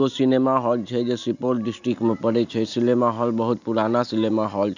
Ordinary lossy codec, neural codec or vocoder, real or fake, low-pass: none; none; real; 7.2 kHz